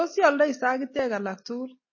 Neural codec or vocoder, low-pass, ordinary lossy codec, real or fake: none; 7.2 kHz; MP3, 32 kbps; real